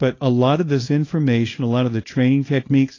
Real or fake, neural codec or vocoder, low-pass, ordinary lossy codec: fake; codec, 24 kHz, 0.9 kbps, WavTokenizer, small release; 7.2 kHz; AAC, 32 kbps